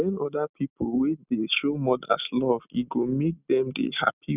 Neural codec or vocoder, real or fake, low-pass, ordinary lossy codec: none; real; 3.6 kHz; none